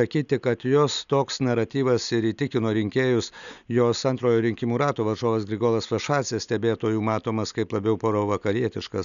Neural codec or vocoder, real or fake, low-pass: none; real; 7.2 kHz